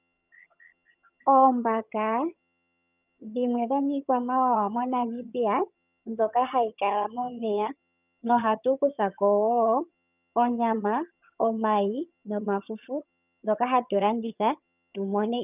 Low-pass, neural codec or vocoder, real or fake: 3.6 kHz; vocoder, 22.05 kHz, 80 mel bands, HiFi-GAN; fake